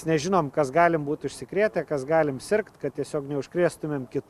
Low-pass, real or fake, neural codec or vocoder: 14.4 kHz; real; none